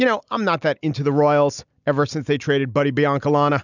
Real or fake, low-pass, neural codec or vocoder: real; 7.2 kHz; none